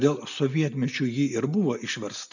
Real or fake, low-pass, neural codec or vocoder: real; 7.2 kHz; none